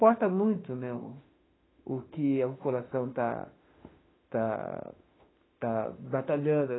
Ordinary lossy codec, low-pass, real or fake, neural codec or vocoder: AAC, 16 kbps; 7.2 kHz; fake; autoencoder, 48 kHz, 32 numbers a frame, DAC-VAE, trained on Japanese speech